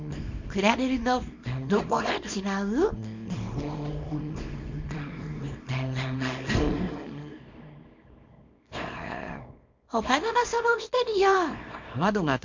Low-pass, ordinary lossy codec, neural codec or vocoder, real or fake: 7.2 kHz; MP3, 48 kbps; codec, 24 kHz, 0.9 kbps, WavTokenizer, small release; fake